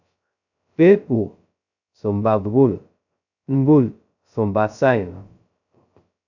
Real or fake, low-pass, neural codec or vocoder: fake; 7.2 kHz; codec, 16 kHz, 0.2 kbps, FocalCodec